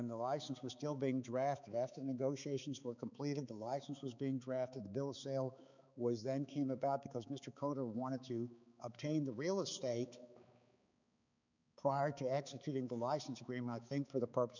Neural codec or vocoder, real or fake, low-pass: codec, 16 kHz, 4 kbps, X-Codec, HuBERT features, trained on balanced general audio; fake; 7.2 kHz